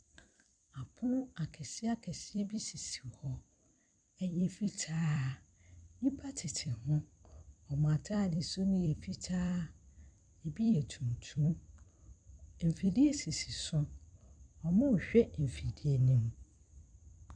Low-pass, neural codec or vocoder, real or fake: 9.9 kHz; vocoder, 44.1 kHz, 128 mel bands every 256 samples, BigVGAN v2; fake